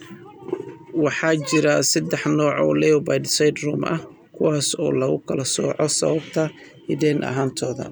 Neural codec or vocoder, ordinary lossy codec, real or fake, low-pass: none; none; real; none